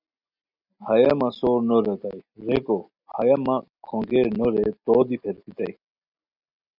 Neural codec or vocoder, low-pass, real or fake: none; 5.4 kHz; real